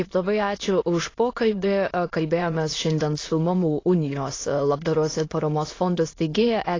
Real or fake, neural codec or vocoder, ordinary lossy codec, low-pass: fake; autoencoder, 22.05 kHz, a latent of 192 numbers a frame, VITS, trained on many speakers; AAC, 32 kbps; 7.2 kHz